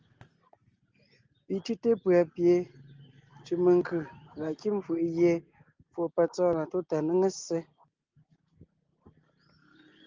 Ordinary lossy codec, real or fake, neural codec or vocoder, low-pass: Opus, 24 kbps; real; none; 7.2 kHz